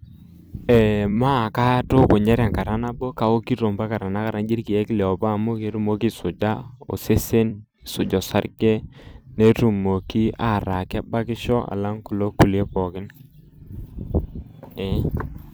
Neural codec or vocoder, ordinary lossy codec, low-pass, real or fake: vocoder, 44.1 kHz, 128 mel bands every 256 samples, BigVGAN v2; none; none; fake